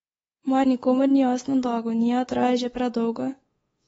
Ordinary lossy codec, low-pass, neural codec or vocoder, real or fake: AAC, 24 kbps; 10.8 kHz; codec, 24 kHz, 3.1 kbps, DualCodec; fake